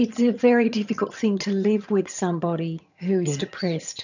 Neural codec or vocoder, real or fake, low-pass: vocoder, 22.05 kHz, 80 mel bands, HiFi-GAN; fake; 7.2 kHz